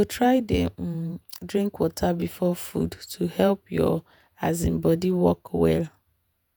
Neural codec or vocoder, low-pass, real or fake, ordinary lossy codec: vocoder, 48 kHz, 128 mel bands, Vocos; none; fake; none